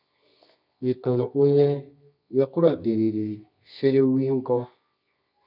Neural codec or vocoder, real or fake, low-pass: codec, 24 kHz, 0.9 kbps, WavTokenizer, medium music audio release; fake; 5.4 kHz